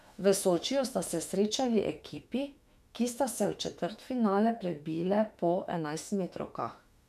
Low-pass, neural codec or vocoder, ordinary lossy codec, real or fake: 14.4 kHz; autoencoder, 48 kHz, 32 numbers a frame, DAC-VAE, trained on Japanese speech; none; fake